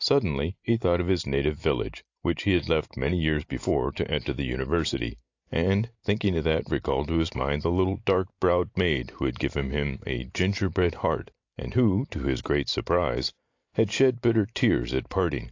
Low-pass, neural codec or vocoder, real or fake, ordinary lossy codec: 7.2 kHz; none; real; AAC, 48 kbps